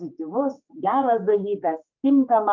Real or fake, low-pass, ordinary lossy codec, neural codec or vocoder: fake; 7.2 kHz; Opus, 24 kbps; codec, 16 kHz, 4 kbps, X-Codec, HuBERT features, trained on general audio